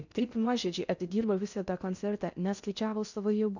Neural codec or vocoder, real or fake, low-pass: codec, 16 kHz in and 24 kHz out, 0.6 kbps, FocalCodec, streaming, 2048 codes; fake; 7.2 kHz